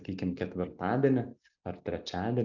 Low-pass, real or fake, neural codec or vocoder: 7.2 kHz; real; none